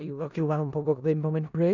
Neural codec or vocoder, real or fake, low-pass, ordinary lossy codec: codec, 16 kHz in and 24 kHz out, 0.4 kbps, LongCat-Audio-Codec, four codebook decoder; fake; 7.2 kHz; none